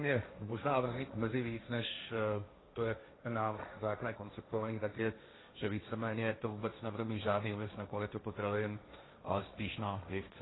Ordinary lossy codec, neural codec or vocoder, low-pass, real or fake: AAC, 16 kbps; codec, 16 kHz, 1.1 kbps, Voila-Tokenizer; 7.2 kHz; fake